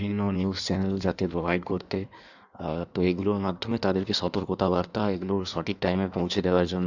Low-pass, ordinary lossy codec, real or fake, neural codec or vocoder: 7.2 kHz; none; fake; codec, 16 kHz in and 24 kHz out, 1.1 kbps, FireRedTTS-2 codec